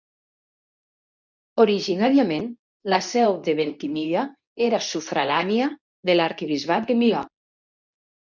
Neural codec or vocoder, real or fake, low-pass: codec, 24 kHz, 0.9 kbps, WavTokenizer, medium speech release version 2; fake; 7.2 kHz